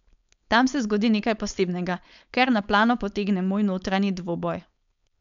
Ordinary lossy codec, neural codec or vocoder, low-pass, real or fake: none; codec, 16 kHz, 4.8 kbps, FACodec; 7.2 kHz; fake